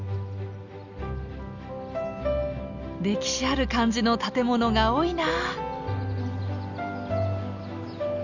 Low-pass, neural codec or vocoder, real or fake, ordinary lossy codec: 7.2 kHz; none; real; none